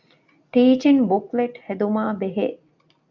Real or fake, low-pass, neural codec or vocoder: real; 7.2 kHz; none